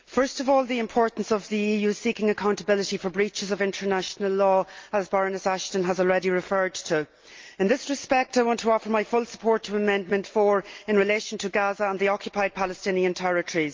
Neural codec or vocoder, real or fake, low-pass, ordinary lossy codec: none; real; 7.2 kHz; Opus, 32 kbps